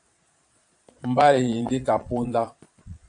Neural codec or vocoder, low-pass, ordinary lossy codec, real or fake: vocoder, 22.05 kHz, 80 mel bands, Vocos; 9.9 kHz; AAC, 64 kbps; fake